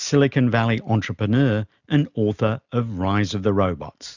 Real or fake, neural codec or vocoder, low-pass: real; none; 7.2 kHz